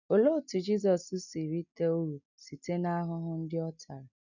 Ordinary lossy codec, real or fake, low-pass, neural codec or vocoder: none; real; 7.2 kHz; none